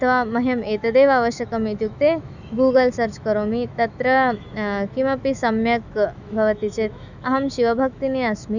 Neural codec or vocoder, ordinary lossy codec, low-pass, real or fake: autoencoder, 48 kHz, 128 numbers a frame, DAC-VAE, trained on Japanese speech; none; 7.2 kHz; fake